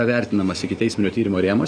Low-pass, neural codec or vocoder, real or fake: 9.9 kHz; none; real